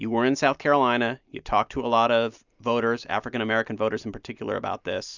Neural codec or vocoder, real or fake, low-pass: none; real; 7.2 kHz